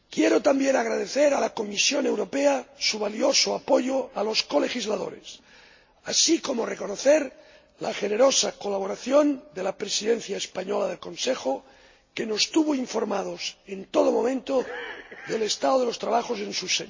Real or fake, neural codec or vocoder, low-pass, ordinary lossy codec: real; none; 7.2 kHz; MP3, 32 kbps